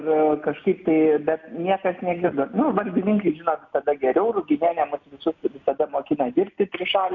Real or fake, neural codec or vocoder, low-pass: real; none; 7.2 kHz